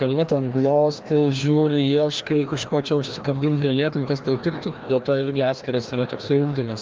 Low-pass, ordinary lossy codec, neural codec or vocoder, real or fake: 7.2 kHz; Opus, 32 kbps; codec, 16 kHz, 1 kbps, FreqCodec, larger model; fake